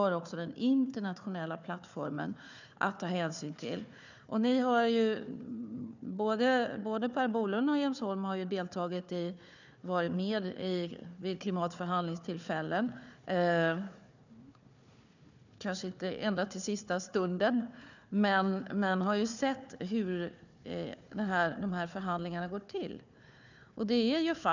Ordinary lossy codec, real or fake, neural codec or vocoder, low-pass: none; fake; codec, 16 kHz, 4 kbps, FunCodec, trained on Chinese and English, 50 frames a second; 7.2 kHz